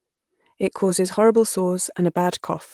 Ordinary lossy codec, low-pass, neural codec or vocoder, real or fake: Opus, 24 kbps; 19.8 kHz; vocoder, 44.1 kHz, 128 mel bands, Pupu-Vocoder; fake